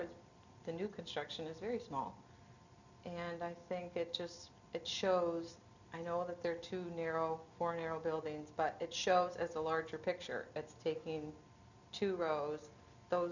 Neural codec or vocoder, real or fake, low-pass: none; real; 7.2 kHz